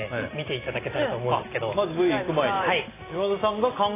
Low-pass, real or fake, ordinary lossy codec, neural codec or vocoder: 3.6 kHz; real; AAC, 16 kbps; none